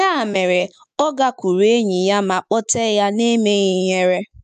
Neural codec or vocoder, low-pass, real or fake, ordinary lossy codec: autoencoder, 48 kHz, 128 numbers a frame, DAC-VAE, trained on Japanese speech; 14.4 kHz; fake; none